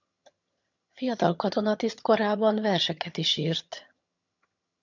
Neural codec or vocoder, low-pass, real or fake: vocoder, 22.05 kHz, 80 mel bands, HiFi-GAN; 7.2 kHz; fake